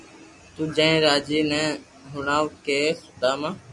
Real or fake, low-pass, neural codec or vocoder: real; 10.8 kHz; none